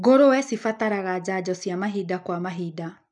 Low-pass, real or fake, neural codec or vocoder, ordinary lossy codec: 10.8 kHz; real; none; none